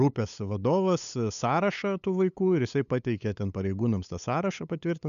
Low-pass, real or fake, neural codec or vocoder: 7.2 kHz; fake; codec, 16 kHz, 8 kbps, FunCodec, trained on LibriTTS, 25 frames a second